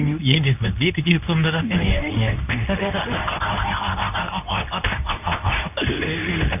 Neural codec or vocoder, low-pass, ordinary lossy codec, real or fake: codec, 24 kHz, 0.9 kbps, WavTokenizer, medium speech release version 2; 3.6 kHz; none; fake